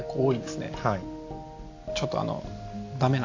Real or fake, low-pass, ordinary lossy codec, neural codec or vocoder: real; 7.2 kHz; AAC, 48 kbps; none